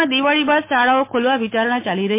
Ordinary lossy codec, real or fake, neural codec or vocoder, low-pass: MP3, 24 kbps; fake; autoencoder, 48 kHz, 128 numbers a frame, DAC-VAE, trained on Japanese speech; 3.6 kHz